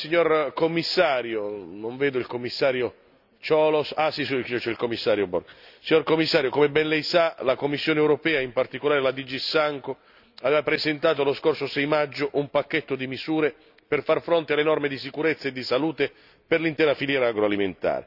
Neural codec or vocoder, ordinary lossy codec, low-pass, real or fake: none; none; 5.4 kHz; real